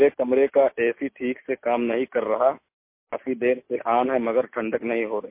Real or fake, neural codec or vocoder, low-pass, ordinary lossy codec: real; none; 3.6 kHz; MP3, 24 kbps